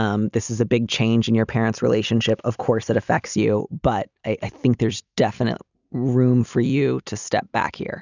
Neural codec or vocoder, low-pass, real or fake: none; 7.2 kHz; real